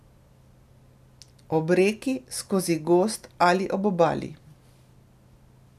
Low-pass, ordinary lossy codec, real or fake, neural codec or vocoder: 14.4 kHz; none; real; none